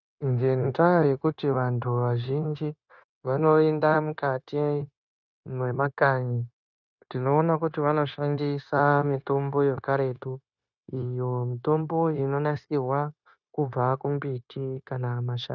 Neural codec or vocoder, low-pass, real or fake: codec, 16 kHz, 0.9 kbps, LongCat-Audio-Codec; 7.2 kHz; fake